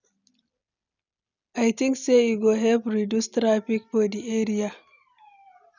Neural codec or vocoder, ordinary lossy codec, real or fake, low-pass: none; none; real; 7.2 kHz